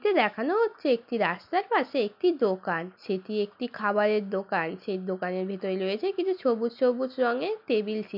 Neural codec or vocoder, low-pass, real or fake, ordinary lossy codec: none; 5.4 kHz; real; MP3, 48 kbps